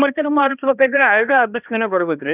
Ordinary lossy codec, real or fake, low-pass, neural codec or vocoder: none; fake; 3.6 kHz; codec, 16 kHz, 1 kbps, X-Codec, HuBERT features, trained on balanced general audio